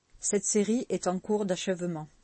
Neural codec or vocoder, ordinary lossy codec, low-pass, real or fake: none; MP3, 32 kbps; 9.9 kHz; real